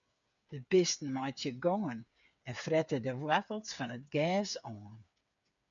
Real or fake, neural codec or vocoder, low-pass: fake; codec, 16 kHz, 8 kbps, FreqCodec, smaller model; 7.2 kHz